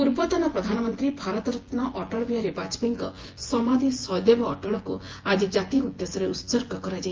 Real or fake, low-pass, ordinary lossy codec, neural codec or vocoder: fake; 7.2 kHz; Opus, 16 kbps; vocoder, 24 kHz, 100 mel bands, Vocos